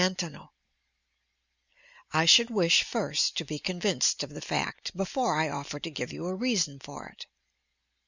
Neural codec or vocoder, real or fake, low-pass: none; real; 7.2 kHz